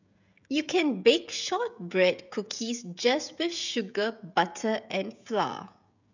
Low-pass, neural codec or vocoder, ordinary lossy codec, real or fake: 7.2 kHz; codec, 16 kHz, 16 kbps, FreqCodec, smaller model; none; fake